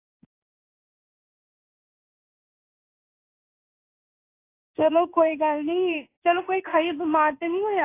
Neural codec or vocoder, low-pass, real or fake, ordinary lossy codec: codec, 16 kHz in and 24 kHz out, 1 kbps, XY-Tokenizer; 3.6 kHz; fake; AAC, 24 kbps